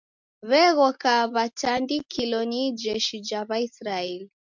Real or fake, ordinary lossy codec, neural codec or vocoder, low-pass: real; MP3, 64 kbps; none; 7.2 kHz